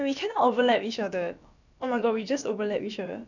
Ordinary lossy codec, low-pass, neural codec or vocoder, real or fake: none; 7.2 kHz; codec, 16 kHz, about 1 kbps, DyCAST, with the encoder's durations; fake